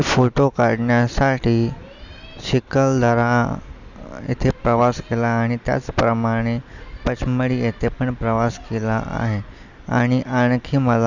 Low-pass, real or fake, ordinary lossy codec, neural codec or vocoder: 7.2 kHz; real; none; none